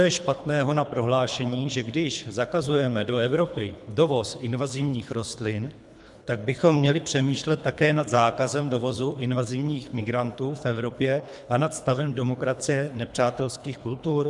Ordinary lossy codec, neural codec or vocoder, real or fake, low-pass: MP3, 96 kbps; codec, 24 kHz, 3 kbps, HILCodec; fake; 10.8 kHz